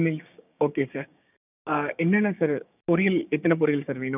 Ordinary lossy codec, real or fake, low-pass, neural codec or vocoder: none; fake; 3.6 kHz; codec, 16 kHz, 6 kbps, DAC